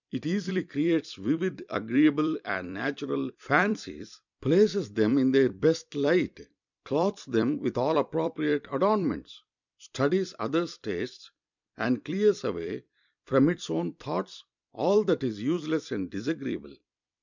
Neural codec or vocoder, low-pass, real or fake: vocoder, 44.1 kHz, 128 mel bands every 256 samples, BigVGAN v2; 7.2 kHz; fake